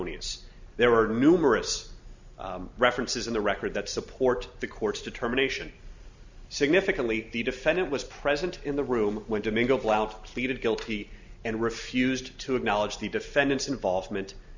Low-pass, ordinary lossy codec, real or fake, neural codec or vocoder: 7.2 kHz; Opus, 64 kbps; real; none